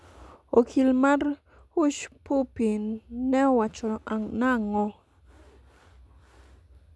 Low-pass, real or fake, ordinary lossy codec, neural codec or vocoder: none; real; none; none